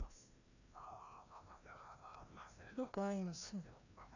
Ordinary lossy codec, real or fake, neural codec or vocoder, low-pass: none; fake; codec, 16 kHz, 1 kbps, FreqCodec, larger model; 7.2 kHz